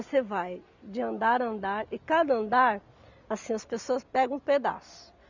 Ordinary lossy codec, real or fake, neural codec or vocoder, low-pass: none; real; none; 7.2 kHz